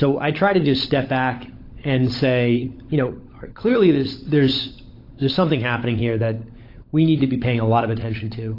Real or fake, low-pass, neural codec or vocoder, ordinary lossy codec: fake; 5.4 kHz; codec, 16 kHz, 16 kbps, FunCodec, trained on LibriTTS, 50 frames a second; AAC, 32 kbps